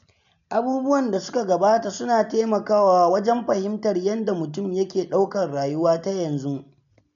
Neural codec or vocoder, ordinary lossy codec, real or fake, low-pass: none; none; real; 7.2 kHz